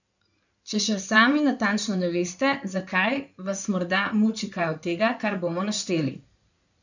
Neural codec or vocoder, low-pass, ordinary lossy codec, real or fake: codec, 16 kHz in and 24 kHz out, 2.2 kbps, FireRedTTS-2 codec; 7.2 kHz; none; fake